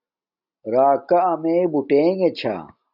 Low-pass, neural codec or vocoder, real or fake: 5.4 kHz; none; real